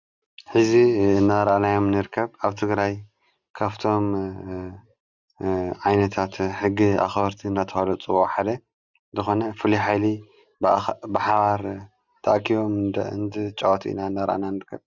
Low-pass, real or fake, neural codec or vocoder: 7.2 kHz; real; none